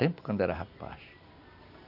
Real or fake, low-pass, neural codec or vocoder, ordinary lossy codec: real; 5.4 kHz; none; none